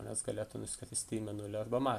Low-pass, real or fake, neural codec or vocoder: 14.4 kHz; fake; vocoder, 44.1 kHz, 128 mel bands every 512 samples, BigVGAN v2